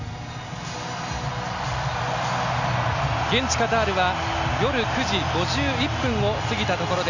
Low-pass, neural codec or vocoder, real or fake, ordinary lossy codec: 7.2 kHz; none; real; none